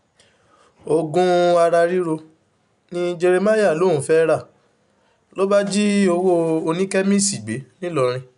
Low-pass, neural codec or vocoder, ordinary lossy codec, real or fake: 10.8 kHz; none; none; real